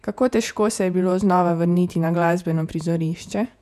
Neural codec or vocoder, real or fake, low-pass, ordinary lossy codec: vocoder, 48 kHz, 128 mel bands, Vocos; fake; 14.4 kHz; none